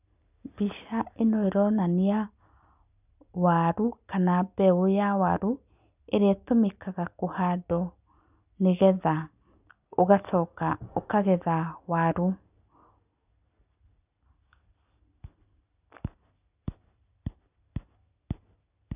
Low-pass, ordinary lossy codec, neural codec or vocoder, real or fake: 3.6 kHz; none; none; real